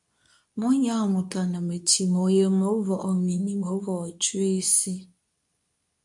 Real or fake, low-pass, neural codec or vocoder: fake; 10.8 kHz; codec, 24 kHz, 0.9 kbps, WavTokenizer, medium speech release version 2